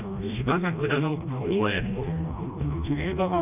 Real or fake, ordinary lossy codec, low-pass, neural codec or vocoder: fake; none; 3.6 kHz; codec, 16 kHz, 1 kbps, FreqCodec, smaller model